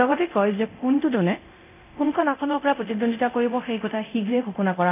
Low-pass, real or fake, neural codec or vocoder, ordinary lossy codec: 3.6 kHz; fake; codec, 24 kHz, 0.9 kbps, DualCodec; none